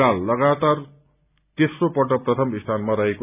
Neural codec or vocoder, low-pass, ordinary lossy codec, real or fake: none; 3.6 kHz; none; real